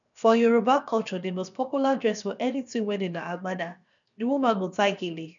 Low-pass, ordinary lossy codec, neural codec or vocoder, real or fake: 7.2 kHz; none; codec, 16 kHz, 0.7 kbps, FocalCodec; fake